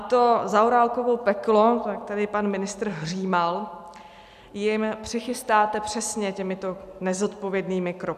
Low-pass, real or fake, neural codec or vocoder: 14.4 kHz; real; none